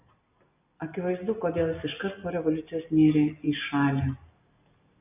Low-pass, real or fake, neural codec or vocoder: 3.6 kHz; real; none